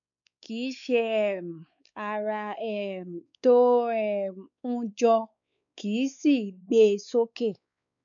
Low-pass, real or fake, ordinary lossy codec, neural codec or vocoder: 7.2 kHz; fake; none; codec, 16 kHz, 4 kbps, X-Codec, WavLM features, trained on Multilingual LibriSpeech